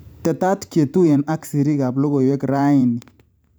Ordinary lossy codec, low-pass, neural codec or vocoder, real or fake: none; none; vocoder, 44.1 kHz, 128 mel bands every 512 samples, BigVGAN v2; fake